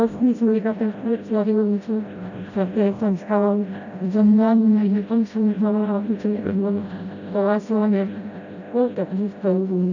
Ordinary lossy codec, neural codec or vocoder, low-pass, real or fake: none; codec, 16 kHz, 0.5 kbps, FreqCodec, smaller model; 7.2 kHz; fake